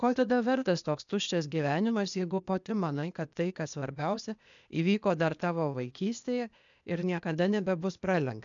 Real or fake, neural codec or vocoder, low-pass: fake; codec, 16 kHz, 0.8 kbps, ZipCodec; 7.2 kHz